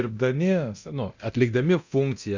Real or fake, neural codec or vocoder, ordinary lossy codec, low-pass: fake; codec, 24 kHz, 0.9 kbps, DualCodec; Opus, 64 kbps; 7.2 kHz